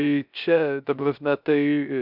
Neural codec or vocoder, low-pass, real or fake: codec, 16 kHz, 0.3 kbps, FocalCodec; 5.4 kHz; fake